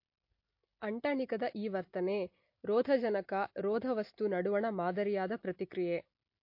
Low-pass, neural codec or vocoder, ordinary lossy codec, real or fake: 5.4 kHz; none; MP3, 32 kbps; real